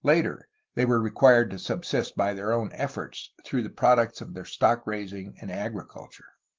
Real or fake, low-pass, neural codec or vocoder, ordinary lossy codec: real; 7.2 kHz; none; Opus, 16 kbps